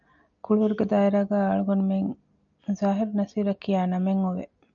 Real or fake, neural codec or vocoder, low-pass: real; none; 7.2 kHz